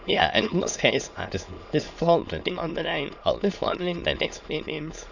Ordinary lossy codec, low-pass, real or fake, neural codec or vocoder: none; 7.2 kHz; fake; autoencoder, 22.05 kHz, a latent of 192 numbers a frame, VITS, trained on many speakers